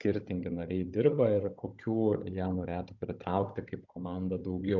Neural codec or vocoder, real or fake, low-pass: codec, 16 kHz, 16 kbps, FunCodec, trained on Chinese and English, 50 frames a second; fake; 7.2 kHz